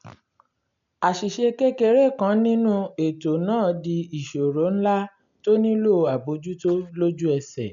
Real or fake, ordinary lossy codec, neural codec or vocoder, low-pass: real; none; none; 7.2 kHz